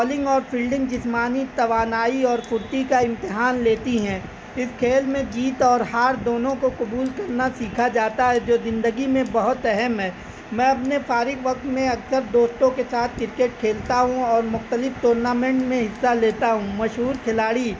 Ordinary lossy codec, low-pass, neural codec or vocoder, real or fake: none; none; none; real